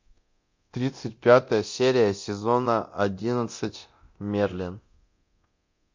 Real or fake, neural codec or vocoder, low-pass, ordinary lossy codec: fake; codec, 24 kHz, 0.9 kbps, DualCodec; 7.2 kHz; MP3, 48 kbps